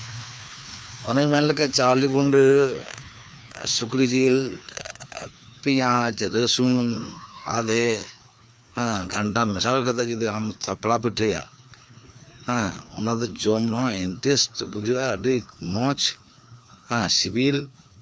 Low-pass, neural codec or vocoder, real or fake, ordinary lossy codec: none; codec, 16 kHz, 2 kbps, FreqCodec, larger model; fake; none